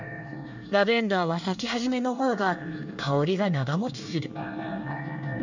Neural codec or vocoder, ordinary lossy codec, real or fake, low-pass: codec, 24 kHz, 1 kbps, SNAC; none; fake; 7.2 kHz